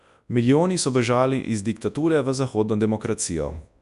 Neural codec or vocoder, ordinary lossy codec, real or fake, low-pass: codec, 24 kHz, 0.9 kbps, WavTokenizer, large speech release; none; fake; 10.8 kHz